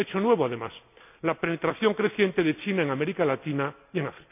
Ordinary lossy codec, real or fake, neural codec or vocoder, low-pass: none; real; none; 3.6 kHz